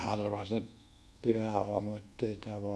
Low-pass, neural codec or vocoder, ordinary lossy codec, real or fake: none; codec, 24 kHz, 1.2 kbps, DualCodec; none; fake